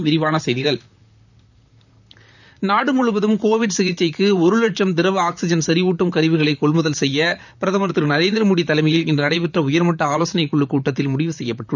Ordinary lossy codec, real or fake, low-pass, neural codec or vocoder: none; fake; 7.2 kHz; vocoder, 44.1 kHz, 128 mel bands, Pupu-Vocoder